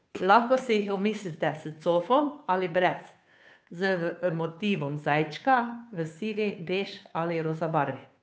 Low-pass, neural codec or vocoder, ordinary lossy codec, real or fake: none; codec, 16 kHz, 2 kbps, FunCodec, trained on Chinese and English, 25 frames a second; none; fake